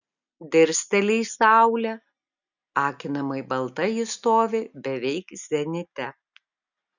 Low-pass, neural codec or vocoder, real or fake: 7.2 kHz; none; real